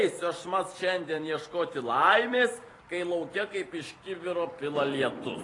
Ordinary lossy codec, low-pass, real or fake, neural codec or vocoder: AAC, 32 kbps; 10.8 kHz; fake; vocoder, 44.1 kHz, 128 mel bands every 256 samples, BigVGAN v2